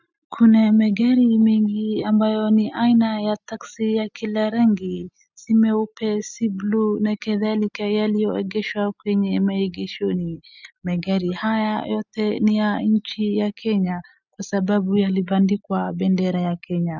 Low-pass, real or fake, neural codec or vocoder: 7.2 kHz; real; none